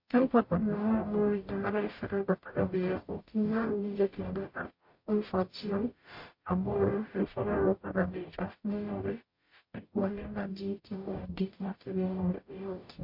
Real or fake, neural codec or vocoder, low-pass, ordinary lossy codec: fake; codec, 44.1 kHz, 0.9 kbps, DAC; 5.4 kHz; MP3, 32 kbps